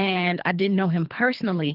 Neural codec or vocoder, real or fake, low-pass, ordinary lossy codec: codec, 24 kHz, 3 kbps, HILCodec; fake; 5.4 kHz; Opus, 32 kbps